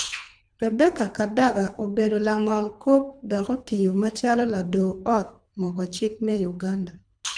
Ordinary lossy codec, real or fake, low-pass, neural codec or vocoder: none; fake; 9.9 kHz; codec, 24 kHz, 3 kbps, HILCodec